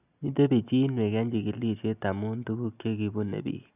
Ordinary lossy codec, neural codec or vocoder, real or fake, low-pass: none; none; real; 3.6 kHz